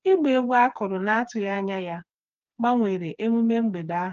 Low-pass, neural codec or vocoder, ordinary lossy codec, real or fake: 7.2 kHz; codec, 16 kHz, 4 kbps, FreqCodec, smaller model; Opus, 24 kbps; fake